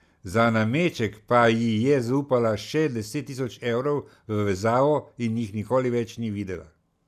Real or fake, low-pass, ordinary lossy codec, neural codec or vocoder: real; 14.4 kHz; none; none